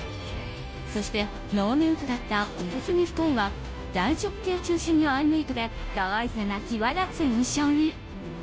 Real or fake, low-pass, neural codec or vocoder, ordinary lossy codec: fake; none; codec, 16 kHz, 0.5 kbps, FunCodec, trained on Chinese and English, 25 frames a second; none